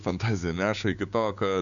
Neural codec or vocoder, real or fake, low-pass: codec, 16 kHz, 6 kbps, DAC; fake; 7.2 kHz